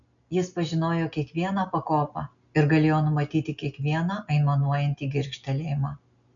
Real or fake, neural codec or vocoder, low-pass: real; none; 7.2 kHz